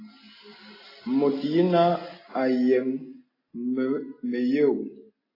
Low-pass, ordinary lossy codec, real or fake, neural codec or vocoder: 5.4 kHz; AAC, 24 kbps; real; none